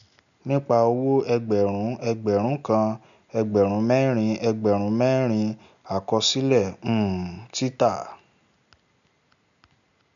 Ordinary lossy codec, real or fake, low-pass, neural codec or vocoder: MP3, 96 kbps; real; 7.2 kHz; none